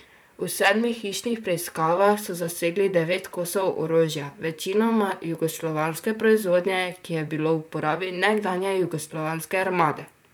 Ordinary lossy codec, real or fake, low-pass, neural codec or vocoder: none; fake; none; vocoder, 44.1 kHz, 128 mel bands, Pupu-Vocoder